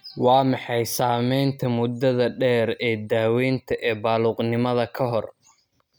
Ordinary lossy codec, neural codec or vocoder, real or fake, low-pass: none; none; real; none